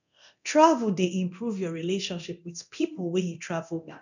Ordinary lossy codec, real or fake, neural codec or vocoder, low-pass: none; fake; codec, 24 kHz, 0.9 kbps, DualCodec; 7.2 kHz